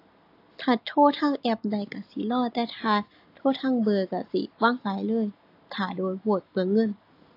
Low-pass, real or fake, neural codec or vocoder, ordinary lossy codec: 5.4 kHz; real; none; AAC, 32 kbps